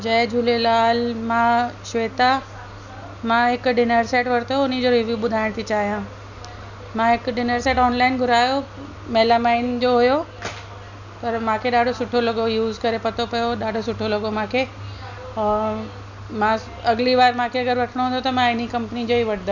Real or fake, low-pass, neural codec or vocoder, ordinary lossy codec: real; 7.2 kHz; none; none